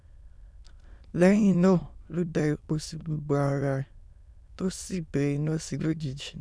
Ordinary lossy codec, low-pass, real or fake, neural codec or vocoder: none; none; fake; autoencoder, 22.05 kHz, a latent of 192 numbers a frame, VITS, trained on many speakers